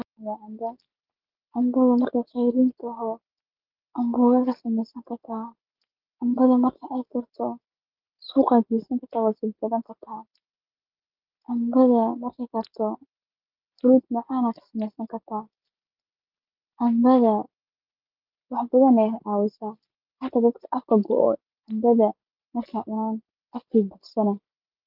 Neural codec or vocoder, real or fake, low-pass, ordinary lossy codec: none; real; 5.4 kHz; Opus, 32 kbps